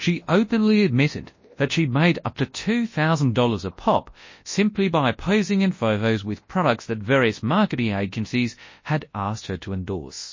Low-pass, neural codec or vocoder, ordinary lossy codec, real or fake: 7.2 kHz; codec, 24 kHz, 0.9 kbps, WavTokenizer, large speech release; MP3, 32 kbps; fake